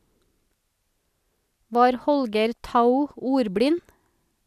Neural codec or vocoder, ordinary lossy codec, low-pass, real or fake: none; none; 14.4 kHz; real